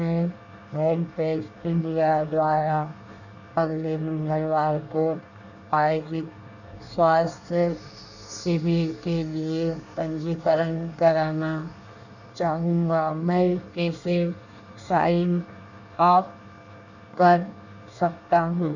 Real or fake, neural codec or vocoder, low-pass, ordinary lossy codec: fake; codec, 24 kHz, 1 kbps, SNAC; 7.2 kHz; none